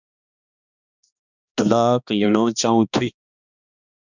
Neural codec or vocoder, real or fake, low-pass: codec, 16 kHz, 2 kbps, X-Codec, HuBERT features, trained on balanced general audio; fake; 7.2 kHz